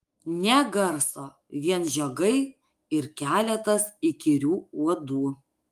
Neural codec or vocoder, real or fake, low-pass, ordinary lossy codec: autoencoder, 48 kHz, 128 numbers a frame, DAC-VAE, trained on Japanese speech; fake; 14.4 kHz; Opus, 32 kbps